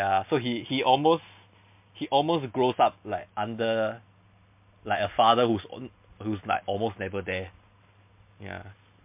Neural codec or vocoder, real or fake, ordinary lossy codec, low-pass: none; real; MP3, 32 kbps; 3.6 kHz